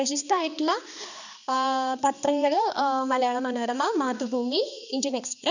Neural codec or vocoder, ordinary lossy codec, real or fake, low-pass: codec, 16 kHz, 2 kbps, X-Codec, HuBERT features, trained on balanced general audio; none; fake; 7.2 kHz